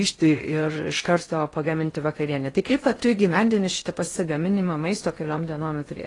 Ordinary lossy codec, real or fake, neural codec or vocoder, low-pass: AAC, 32 kbps; fake; codec, 16 kHz in and 24 kHz out, 0.6 kbps, FocalCodec, streaming, 4096 codes; 10.8 kHz